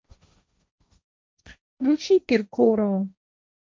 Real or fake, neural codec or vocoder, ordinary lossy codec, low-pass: fake; codec, 16 kHz, 1.1 kbps, Voila-Tokenizer; none; none